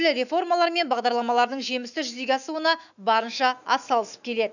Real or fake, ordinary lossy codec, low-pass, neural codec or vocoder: fake; none; 7.2 kHz; autoencoder, 48 kHz, 128 numbers a frame, DAC-VAE, trained on Japanese speech